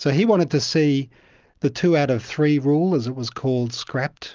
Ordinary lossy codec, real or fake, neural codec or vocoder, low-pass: Opus, 24 kbps; real; none; 7.2 kHz